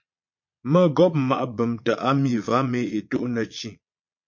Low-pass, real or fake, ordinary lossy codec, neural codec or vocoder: 7.2 kHz; fake; MP3, 48 kbps; vocoder, 22.05 kHz, 80 mel bands, Vocos